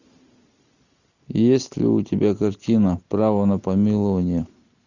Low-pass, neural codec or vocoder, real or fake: 7.2 kHz; none; real